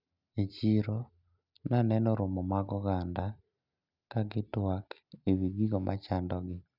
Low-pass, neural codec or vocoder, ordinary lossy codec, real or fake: 5.4 kHz; none; none; real